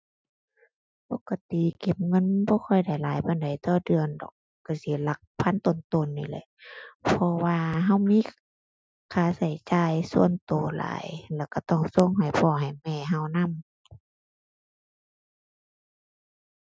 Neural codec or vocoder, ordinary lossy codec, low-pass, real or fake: none; none; none; real